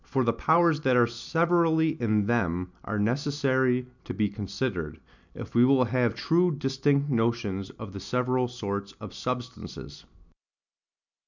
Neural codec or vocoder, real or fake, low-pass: none; real; 7.2 kHz